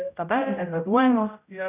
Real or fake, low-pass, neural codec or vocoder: fake; 3.6 kHz; codec, 16 kHz, 0.5 kbps, X-Codec, HuBERT features, trained on general audio